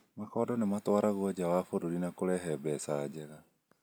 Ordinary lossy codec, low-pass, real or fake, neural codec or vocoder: none; none; real; none